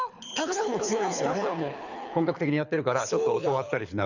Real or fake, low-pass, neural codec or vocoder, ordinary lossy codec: fake; 7.2 kHz; codec, 24 kHz, 6 kbps, HILCodec; none